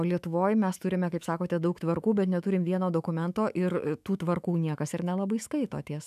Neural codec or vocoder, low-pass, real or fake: autoencoder, 48 kHz, 128 numbers a frame, DAC-VAE, trained on Japanese speech; 14.4 kHz; fake